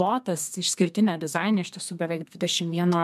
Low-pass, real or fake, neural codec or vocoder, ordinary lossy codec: 14.4 kHz; fake; codec, 32 kHz, 1.9 kbps, SNAC; MP3, 96 kbps